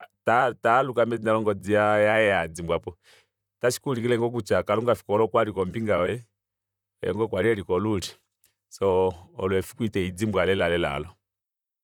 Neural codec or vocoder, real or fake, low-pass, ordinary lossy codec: vocoder, 44.1 kHz, 128 mel bands, Pupu-Vocoder; fake; 19.8 kHz; none